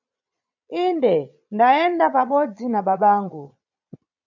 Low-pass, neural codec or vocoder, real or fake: 7.2 kHz; vocoder, 22.05 kHz, 80 mel bands, Vocos; fake